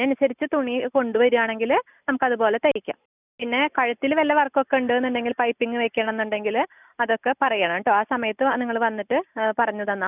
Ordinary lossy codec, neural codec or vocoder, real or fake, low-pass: none; none; real; 3.6 kHz